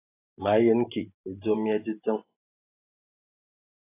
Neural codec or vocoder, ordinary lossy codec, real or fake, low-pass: vocoder, 44.1 kHz, 128 mel bands every 512 samples, BigVGAN v2; AAC, 24 kbps; fake; 3.6 kHz